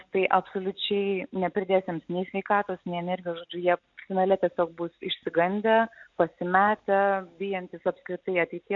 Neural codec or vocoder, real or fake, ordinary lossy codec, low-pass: none; real; AAC, 64 kbps; 7.2 kHz